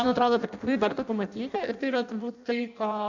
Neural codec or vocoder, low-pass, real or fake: codec, 16 kHz in and 24 kHz out, 0.6 kbps, FireRedTTS-2 codec; 7.2 kHz; fake